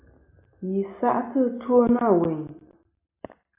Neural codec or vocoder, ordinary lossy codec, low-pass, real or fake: none; AAC, 32 kbps; 3.6 kHz; real